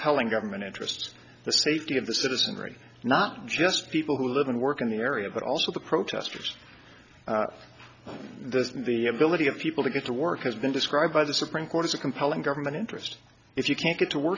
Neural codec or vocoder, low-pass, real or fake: none; 7.2 kHz; real